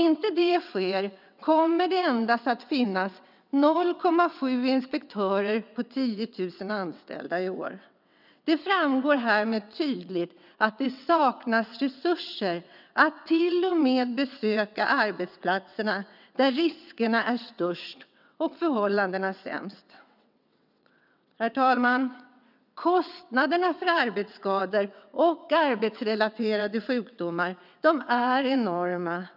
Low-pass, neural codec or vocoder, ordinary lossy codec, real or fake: 5.4 kHz; vocoder, 22.05 kHz, 80 mel bands, WaveNeXt; none; fake